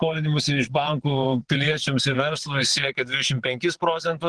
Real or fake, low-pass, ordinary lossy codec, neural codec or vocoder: fake; 9.9 kHz; Opus, 16 kbps; vocoder, 22.05 kHz, 80 mel bands, WaveNeXt